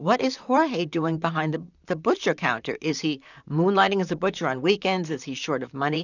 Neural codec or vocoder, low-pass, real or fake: vocoder, 44.1 kHz, 128 mel bands, Pupu-Vocoder; 7.2 kHz; fake